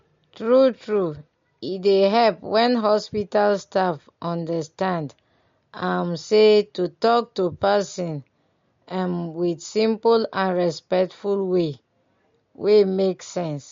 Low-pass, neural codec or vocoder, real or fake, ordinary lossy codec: 7.2 kHz; none; real; MP3, 48 kbps